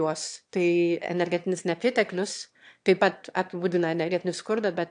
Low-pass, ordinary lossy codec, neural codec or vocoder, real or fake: 9.9 kHz; AAC, 64 kbps; autoencoder, 22.05 kHz, a latent of 192 numbers a frame, VITS, trained on one speaker; fake